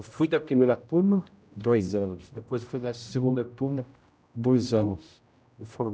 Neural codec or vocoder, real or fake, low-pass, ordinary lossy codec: codec, 16 kHz, 0.5 kbps, X-Codec, HuBERT features, trained on general audio; fake; none; none